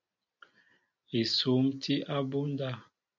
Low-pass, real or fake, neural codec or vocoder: 7.2 kHz; real; none